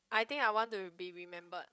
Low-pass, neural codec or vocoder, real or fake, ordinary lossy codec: none; codec, 16 kHz, 16 kbps, FreqCodec, larger model; fake; none